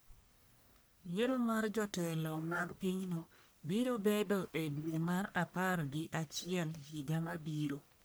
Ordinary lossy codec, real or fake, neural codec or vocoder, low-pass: none; fake; codec, 44.1 kHz, 1.7 kbps, Pupu-Codec; none